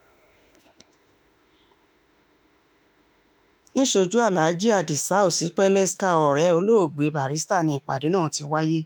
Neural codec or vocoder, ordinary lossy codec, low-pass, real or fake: autoencoder, 48 kHz, 32 numbers a frame, DAC-VAE, trained on Japanese speech; none; none; fake